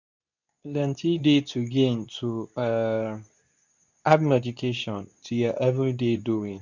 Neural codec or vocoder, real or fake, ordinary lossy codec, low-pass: codec, 24 kHz, 0.9 kbps, WavTokenizer, medium speech release version 2; fake; none; 7.2 kHz